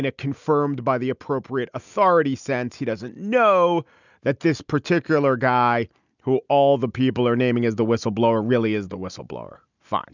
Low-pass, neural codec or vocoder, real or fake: 7.2 kHz; none; real